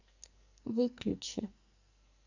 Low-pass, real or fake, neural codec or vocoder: 7.2 kHz; fake; codec, 44.1 kHz, 2.6 kbps, SNAC